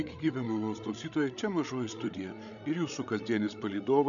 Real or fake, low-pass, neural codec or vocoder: fake; 7.2 kHz; codec, 16 kHz, 16 kbps, FreqCodec, larger model